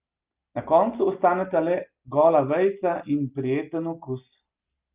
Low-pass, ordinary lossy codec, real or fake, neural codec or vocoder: 3.6 kHz; Opus, 32 kbps; real; none